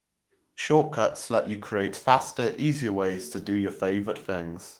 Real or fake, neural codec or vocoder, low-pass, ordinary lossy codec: fake; autoencoder, 48 kHz, 32 numbers a frame, DAC-VAE, trained on Japanese speech; 19.8 kHz; Opus, 24 kbps